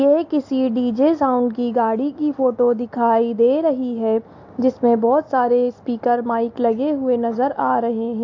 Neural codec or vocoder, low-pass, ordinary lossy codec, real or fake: none; 7.2 kHz; AAC, 48 kbps; real